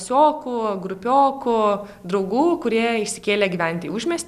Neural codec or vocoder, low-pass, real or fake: none; 14.4 kHz; real